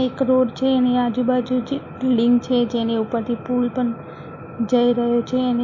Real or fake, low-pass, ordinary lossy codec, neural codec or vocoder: real; 7.2 kHz; MP3, 32 kbps; none